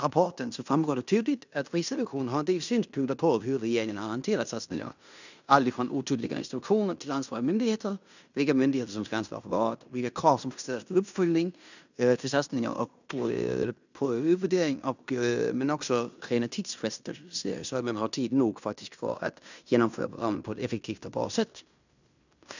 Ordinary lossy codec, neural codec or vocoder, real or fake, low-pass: none; codec, 16 kHz in and 24 kHz out, 0.9 kbps, LongCat-Audio-Codec, fine tuned four codebook decoder; fake; 7.2 kHz